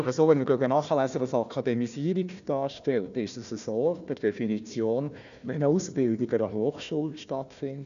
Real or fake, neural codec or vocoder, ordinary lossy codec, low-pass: fake; codec, 16 kHz, 1 kbps, FunCodec, trained on Chinese and English, 50 frames a second; none; 7.2 kHz